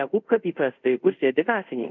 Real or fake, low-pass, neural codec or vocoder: fake; 7.2 kHz; codec, 24 kHz, 0.5 kbps, DualCodec